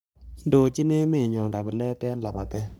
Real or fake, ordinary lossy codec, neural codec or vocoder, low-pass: fake; none; codec, 44.1 kHz, 3.4 kbps, Pupu-Codec; none